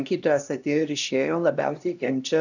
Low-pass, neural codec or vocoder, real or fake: 7.2 kHz; codec, 16 kHz, 1 kbps, X-Codec, HuBERT features, trained on LibriSpeech; fake